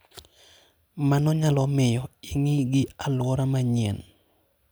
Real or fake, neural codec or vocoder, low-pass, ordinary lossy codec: real; none; none; none